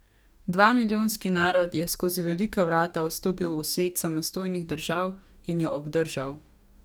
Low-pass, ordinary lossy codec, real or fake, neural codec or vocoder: none; none; fake; codec, 44.1 kHz, 2.6 kbps, DAC